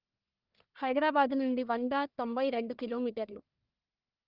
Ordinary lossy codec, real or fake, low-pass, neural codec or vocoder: Opus, 32 kbps; fake; 5.4 kHz; codec, 44.1 kHz, 1.7 kbps, Pupu-Codec